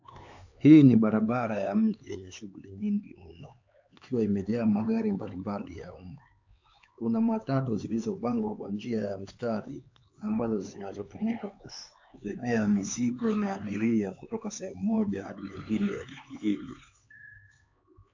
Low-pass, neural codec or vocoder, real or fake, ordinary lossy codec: 7.2 kHz; codec, 16 kHz, 4 kbps, X-Codec, HuBERT features, trained on LibriSpeech; fake; AAC, 48 kbps